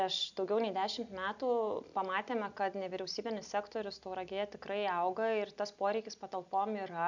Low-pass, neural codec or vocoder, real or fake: 7.2 kHz; none; real